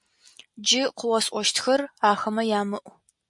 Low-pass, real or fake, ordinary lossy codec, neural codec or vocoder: 10.8 kHz; real; MP3, 64 kbps; none